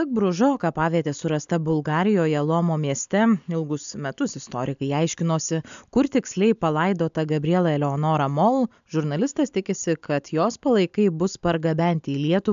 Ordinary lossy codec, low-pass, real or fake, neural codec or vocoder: AAC, 96 kbps; 7.2 kHz; real; none